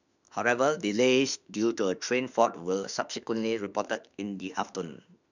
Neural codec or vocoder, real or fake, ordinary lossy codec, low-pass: autoencoder, 48 kHz, 32 numbers a frame, DAC-VAE, trained on Japanese speech; fake; none; 7.2 kHz